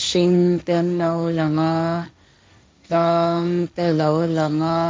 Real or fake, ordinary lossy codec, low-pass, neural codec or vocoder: fake; none; none; codec, 16 kHz, 1.1 kbps, Voila-Tokenizer